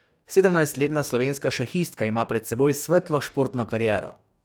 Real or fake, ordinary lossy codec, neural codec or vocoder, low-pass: fake; none; codec, 44.1 kHz, 2.6 kbps, DAC; none